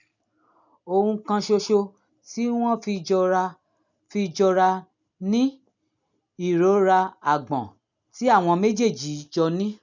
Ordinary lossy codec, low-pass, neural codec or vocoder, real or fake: none; 7.2 kHz; none; real